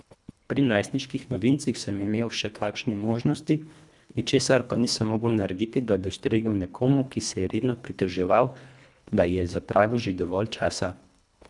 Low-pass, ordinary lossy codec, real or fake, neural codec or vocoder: 10.8 kHz; none; fake; codec, 24 kHz, 1.5 kbps, HILCodec